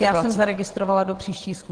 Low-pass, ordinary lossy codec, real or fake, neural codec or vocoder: 9.9 kHz; Opus, 16 kbps; fake; vocoder, 22.05 kHz, 80 mel bands, WaveNeXt